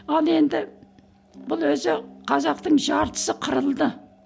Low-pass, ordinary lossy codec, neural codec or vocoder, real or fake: none; none; none; real